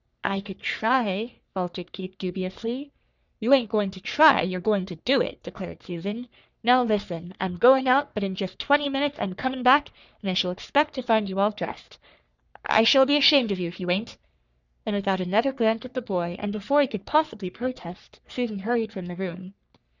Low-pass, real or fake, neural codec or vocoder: 7.2 kHz; fake; codec, 44.1 kHz, 3.4 kbps, Pupu-Codec